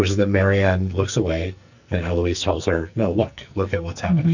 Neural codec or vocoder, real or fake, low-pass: codec, 44.1 kHz, 2.6 kbps, SNAC; fake; 7.2 kHz